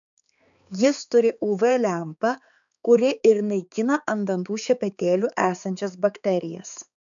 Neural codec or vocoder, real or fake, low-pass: codec, 16 kHz, 4 kbps, X-Codec, HuBERT features, trained on balanced general audio; fake; 7.2 kHz